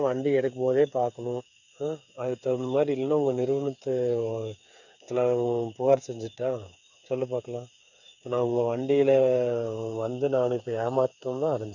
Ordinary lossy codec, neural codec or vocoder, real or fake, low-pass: none; codec, 16 kHz, 8 kbps, FreqCodec, smaller model; fake; 7.2 kHz